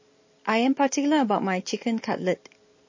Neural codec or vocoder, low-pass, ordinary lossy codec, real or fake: none; 7.2 kHz; MP3, 32 kbps; real